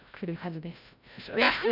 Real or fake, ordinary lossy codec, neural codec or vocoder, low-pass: fake; none; codec, 16 kHz, 0.5 kbps, FreqCodec, larger model; 5.4 kHz